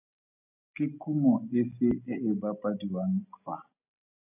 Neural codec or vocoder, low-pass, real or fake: none; 3.6 kHz; real